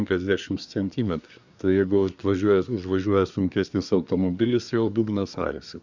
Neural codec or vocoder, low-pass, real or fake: codec, 24 kHz, 1 kbps, SNAC; 7.2 kHz; fake